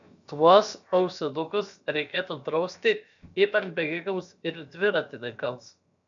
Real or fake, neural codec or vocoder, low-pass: fake; codec, 16 kHz, about 1 kbps, DyCAST, with the encoder's durations; 7.2 kHz